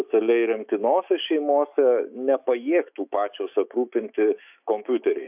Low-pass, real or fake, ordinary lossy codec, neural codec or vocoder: 3.6 kHz; fake; AAC, 32 kbps; autoencoder, 48 kHz, 128 numbers a frame, DAC-VAE, trained on Japanese speech